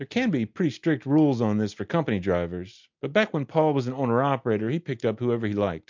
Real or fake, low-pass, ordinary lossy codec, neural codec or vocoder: real; 7.2 kHz; MP3, 64 kbps; none